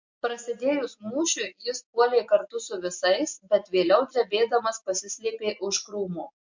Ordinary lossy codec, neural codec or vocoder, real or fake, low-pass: MP3, 48 kbps; none; real; 7.2 kHz